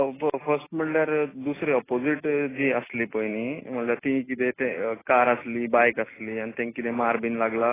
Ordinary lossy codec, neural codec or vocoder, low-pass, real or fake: AAC, 16 kbps; none; 3.6 kHz; real